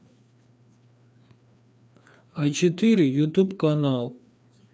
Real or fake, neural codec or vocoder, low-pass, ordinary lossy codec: fake; codec, 16 kHz, 2 kbps, FreqCodec, larger model; none; none